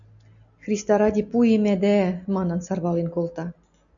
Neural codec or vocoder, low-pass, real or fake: none; 7.2 kHz; real